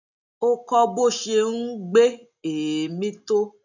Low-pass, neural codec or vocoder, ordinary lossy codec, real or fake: 7.2 kHz; none; none; real